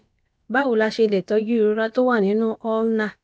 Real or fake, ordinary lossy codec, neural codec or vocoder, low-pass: fake; none; codec, 16 kHz, about 1 kbps, DyCAST, with the encoder's durations; none